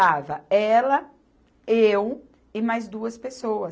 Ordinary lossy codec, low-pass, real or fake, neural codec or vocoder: none; none; real; none